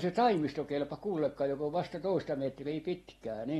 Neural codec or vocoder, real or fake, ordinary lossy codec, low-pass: none; real; AAC, 32 kbps; 19.8 kHz